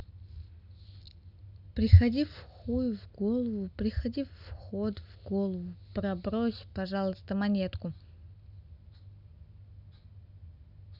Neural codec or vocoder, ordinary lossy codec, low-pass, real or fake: none; none; 5.4 kHz; real